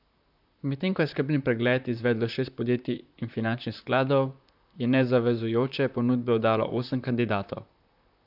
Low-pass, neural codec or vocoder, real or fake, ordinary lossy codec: 5.4 kHz; vocoder, 44.1 kHz, 128 mel bands, Pupu-Vocoder; fake; none